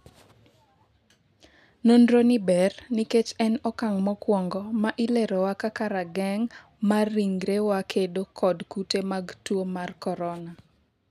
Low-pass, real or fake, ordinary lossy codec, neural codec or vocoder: 14.4 kHz; real; none; none